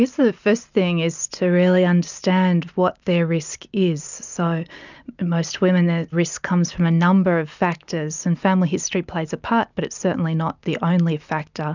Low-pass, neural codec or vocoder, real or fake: 7.2 kHz; none; real